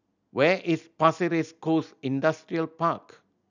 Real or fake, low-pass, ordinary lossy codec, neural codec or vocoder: real; 7.2 kHz; none; none